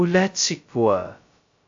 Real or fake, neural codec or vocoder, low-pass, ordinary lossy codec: fake; codec, 16 kHz, 0.2 kbps, FocalCodec; 7.2 kHz; AAC, 64 kbps